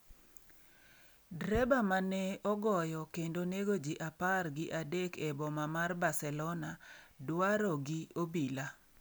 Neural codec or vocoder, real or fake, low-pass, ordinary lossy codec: none; real; none; none